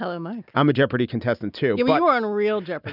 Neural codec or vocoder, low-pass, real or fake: none; 5.4 kHz; real